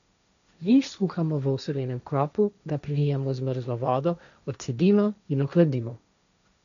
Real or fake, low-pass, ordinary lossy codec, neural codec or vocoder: fake; 7.2 kHz; none; codec, 16 kHz, 1.1 kbps, Voila-Tokenizer